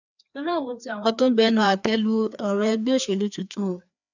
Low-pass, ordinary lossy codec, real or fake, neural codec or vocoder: 7.2 kHz; none; fake; codec, 16 kHz, 2 kbps, FreqCodec, larger model